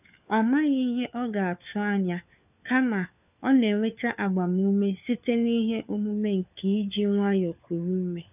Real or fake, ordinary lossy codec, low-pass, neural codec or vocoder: fake; none; 3.6 kHz; codec, 16 kHz, 4 kbps, FunCodec, trained on LibriTTS, 50 frames a second